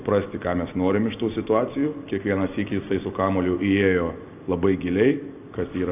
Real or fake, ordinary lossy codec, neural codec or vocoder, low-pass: real; AAC, 32 kbps; none; 3.6 kHz